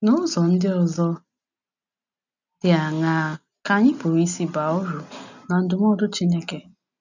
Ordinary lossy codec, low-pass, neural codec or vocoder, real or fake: none; 7.2 kHz; none; real